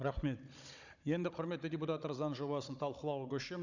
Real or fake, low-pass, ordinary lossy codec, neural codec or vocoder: fake; 7.2 kHz; none; codec, 16 kHz, 16 kbps, FunCodec, trained on Chinese and English, 50 frames a second